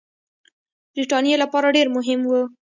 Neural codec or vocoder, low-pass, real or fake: none; 7.2 kHz; real